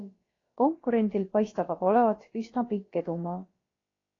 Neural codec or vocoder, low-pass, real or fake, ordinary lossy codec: codec, 16 kHz, about 1 kbps, DyCAST, with the encoder's durations; 7.2 kHz; fake; AAC, 32 kbps